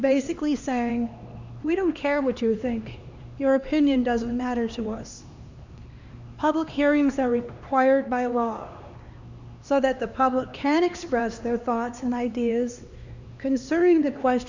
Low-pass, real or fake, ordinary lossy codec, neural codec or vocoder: 7.2 kHz; fake; Opus, 64 kbps; codec, 16 kHz, 2 kbps, X-Codec, HuBERT features, trained on LibriSpeech